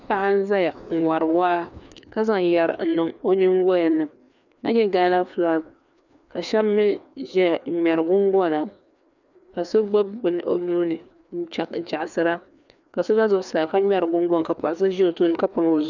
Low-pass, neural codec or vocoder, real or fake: 7.2 kHz; codec, 16 kHz, 2 kbps, FreqCodec, larger model; fake